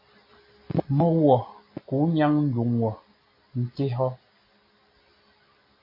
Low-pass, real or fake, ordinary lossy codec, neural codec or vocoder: 5.4 kHz; real; MP3, 24 kbps; none